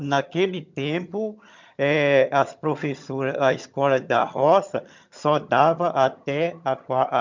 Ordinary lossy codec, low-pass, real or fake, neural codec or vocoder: MP3, 64 kbps; 7.2 kHz; fake; vocoder, 22.05 kHz, 80 mel bands, HiFi-GAN